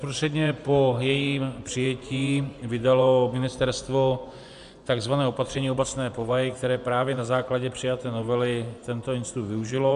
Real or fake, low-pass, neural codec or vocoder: fake; 10.8 kHz; vocoder, 24 kHz, 100 mel bands, Vocos